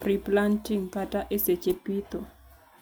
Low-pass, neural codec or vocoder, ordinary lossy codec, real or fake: none; none; none; real